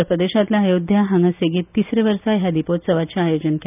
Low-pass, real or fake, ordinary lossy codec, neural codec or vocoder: 3.6 kHz; real; none; none